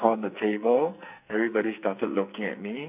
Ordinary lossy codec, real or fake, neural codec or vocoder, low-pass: none; fake; codec, 44.1 kHz, 2.6 kbps, SNAC; 3.6 kHz